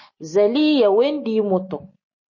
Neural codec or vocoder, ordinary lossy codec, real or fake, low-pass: none; MP3, 32 kbps; real; 7.2 kHz